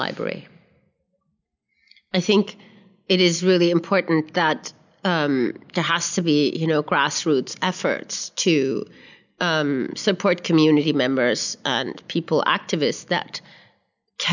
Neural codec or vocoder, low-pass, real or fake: none; 7.2 kHz; real